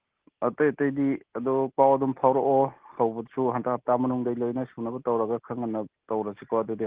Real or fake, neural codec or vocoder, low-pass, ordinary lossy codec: real; none; 3.6 kHz; Opus, 16 kbps